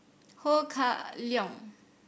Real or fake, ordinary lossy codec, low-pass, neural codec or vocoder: real; none; none; none